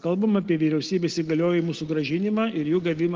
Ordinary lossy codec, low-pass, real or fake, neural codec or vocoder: Opus, 16 kbps; 7.2 kHz; real; none